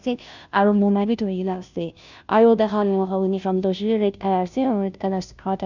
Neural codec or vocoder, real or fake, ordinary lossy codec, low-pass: codec, 16 kHz, 0.5 kbps, FunCodec, trained on Chinese and English, 25 frames a second; fake; none; 7.2 kHz